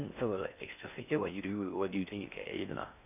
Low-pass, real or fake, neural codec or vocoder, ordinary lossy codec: 3.6 kHz; fake; codec, 16 kHz in and 24 kHz out, 0.6 kbps, FocalCodec, streaming, 4096 codes; none